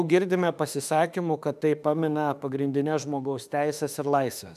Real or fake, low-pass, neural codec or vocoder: fake; 14.4 kHz; autoencoder, 48 kHz, 32 numbers a frame, DAC-VAE, trained on Japanese speech